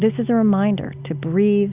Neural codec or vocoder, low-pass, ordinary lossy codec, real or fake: none; 3.6 kHz; Opus, 64 kbps; real